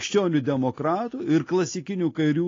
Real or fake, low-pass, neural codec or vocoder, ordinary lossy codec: real; 7.2 kHz; none; AAC, 32 kbps